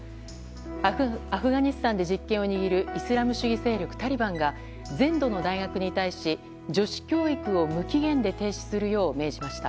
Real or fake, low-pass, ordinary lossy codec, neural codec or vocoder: real; none; none; none